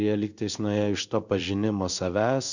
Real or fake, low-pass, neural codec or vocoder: fake; 7.2 kHz; codec, 16 kHz in and 24 kHz out, 1 kbps, XY-Tokenizer